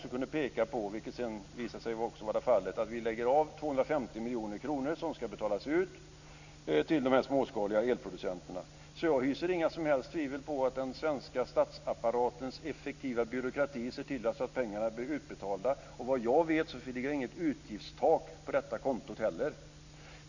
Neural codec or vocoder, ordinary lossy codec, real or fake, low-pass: none; none; real; 7.2 kHz